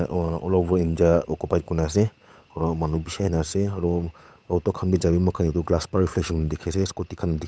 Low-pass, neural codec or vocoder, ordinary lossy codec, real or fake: none; codec, 16 kHz, 8 kbps, FunCodec, trained on Chinese and English, 25 frames a second; none; fake